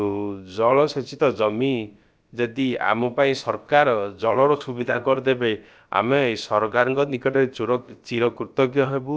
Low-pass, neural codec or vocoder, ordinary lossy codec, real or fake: none; codec, 16 kHz, about 1 kbps, DyCAST, with the encoder's durations; none; fake